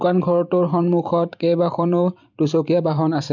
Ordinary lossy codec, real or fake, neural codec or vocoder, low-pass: none; fake; vocoder, 44.1 kHz, 128 mel bands every 512 samples, BigVGAN v2; 7.2 kHz